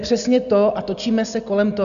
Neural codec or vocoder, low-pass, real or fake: none; 7.2 kHz; real